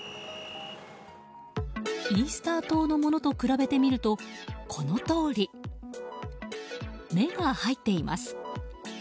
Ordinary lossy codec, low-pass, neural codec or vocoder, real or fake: none; none; none; real